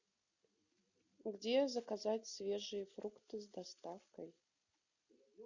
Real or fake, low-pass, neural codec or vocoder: real; 7.2 kHz; none